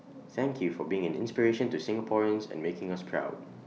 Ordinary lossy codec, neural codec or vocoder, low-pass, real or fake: none; none; none; real